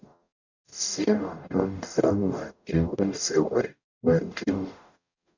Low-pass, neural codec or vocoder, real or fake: 7.2 kHz; codec, 44.1 kHz, 0.9 kbps, DAC; fake